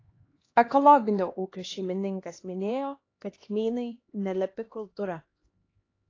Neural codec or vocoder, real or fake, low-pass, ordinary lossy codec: codec, 16 kHz, 2 kbps, X-Codec, HuBERT features, trained on LibriSpeech; fake; 7.2 kHz; AAC, 32 kbps